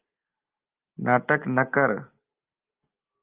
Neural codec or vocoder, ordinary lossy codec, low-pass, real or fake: none; Opus, 32 kbps; 3.6 kHz; real